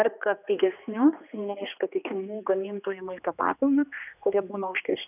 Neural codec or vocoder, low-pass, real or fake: codec, 16 kHz, 2 kbps, X-Codec, HuBERT features, trained on general audio; 3.6 kHz; fake